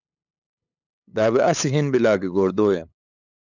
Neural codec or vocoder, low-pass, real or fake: codec, 16 kHz, 8 kbps, FunCodec, trained on LibriTTS, 25 frames a second; 7.2 kHz; fake